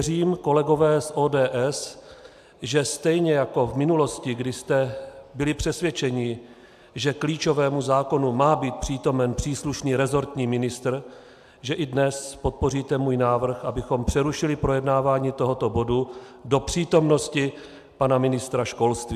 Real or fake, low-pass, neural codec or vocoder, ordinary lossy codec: real; 14.4 kHz; none; AAC, 96 kbps